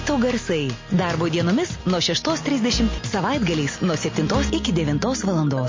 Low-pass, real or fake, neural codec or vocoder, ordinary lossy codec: 7.2 kHz; real; none; MP3, 32 kbps